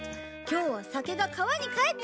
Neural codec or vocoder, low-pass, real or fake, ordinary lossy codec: none; none; real; none